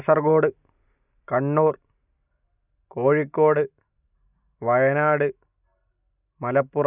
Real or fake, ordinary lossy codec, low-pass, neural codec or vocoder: real; none; 3.6 kHz; none